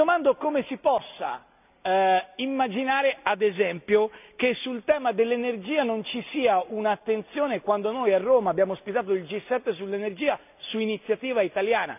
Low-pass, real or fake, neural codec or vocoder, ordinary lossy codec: 3.6 kHz; real; none; none